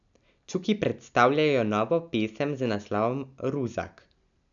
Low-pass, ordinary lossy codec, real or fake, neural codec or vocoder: 7.2 kHz; none; real; none